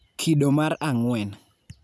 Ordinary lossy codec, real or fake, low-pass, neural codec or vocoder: none; real; none; none